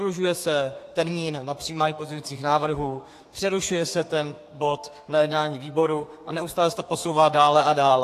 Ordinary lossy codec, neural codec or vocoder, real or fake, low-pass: AAC, 64 kbps; codec, 32 kHz, 1.9 kbps, SNAC; fake; 14.4 kHz